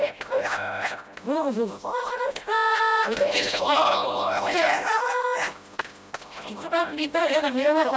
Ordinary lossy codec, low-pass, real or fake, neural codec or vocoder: none; none; fake; codec, 16 kHz, 0.5 kbps, FreqCodec, smaller model